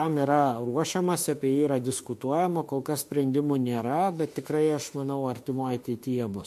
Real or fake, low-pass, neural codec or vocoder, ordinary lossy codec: fake; 14.4 kHz; autoencoder, 48 kHz, 32 numbers a frame, DAC-VAE, trained on Japanese speech; MP3, 64 kbps